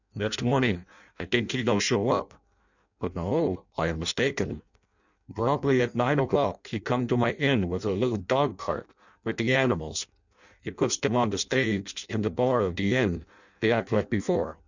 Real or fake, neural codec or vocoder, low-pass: fake; codec, 16 kHz in and 24 kHz out, 0.6 kbps, FireRedTTS-2 codec; 7.2 kHz